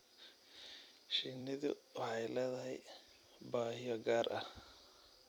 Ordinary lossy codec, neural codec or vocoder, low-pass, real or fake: none; vocoder, 44.1 kHz, 128 mel bands every 256 samples, BigVGAN v2; 19.8 kHz; fake